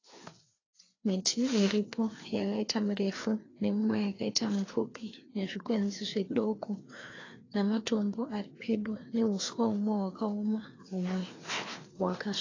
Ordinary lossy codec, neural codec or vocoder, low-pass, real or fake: AAC, 32 kbps; codec, 16 kHz, 2 kbps, FreqCodec, larger model; 7.2 kHz; fake